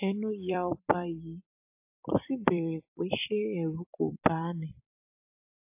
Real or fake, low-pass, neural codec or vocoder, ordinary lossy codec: fake; 3.6 kHz; vocoder, 44.1 kHz, 128 mel bands every 256 samples, BigVGAN v2; AAC, 32 kbps